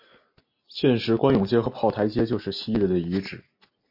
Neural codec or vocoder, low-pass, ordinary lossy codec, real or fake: none; 5.4 kHz; MP3, 32 kbps; real